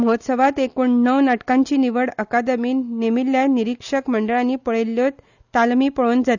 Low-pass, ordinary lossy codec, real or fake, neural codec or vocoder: 7.2 kHz; none; real; none